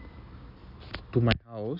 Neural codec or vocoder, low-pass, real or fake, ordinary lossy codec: none; 5.4 kHz; real; none